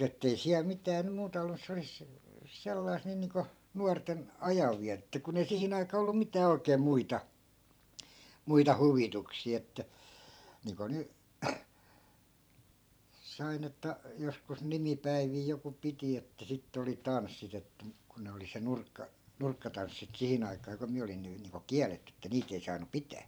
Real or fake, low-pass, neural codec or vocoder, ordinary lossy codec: fake; none; vocoder, 44.1 kHz, 128 mel bands every 512 samples, BigVGAN v2; none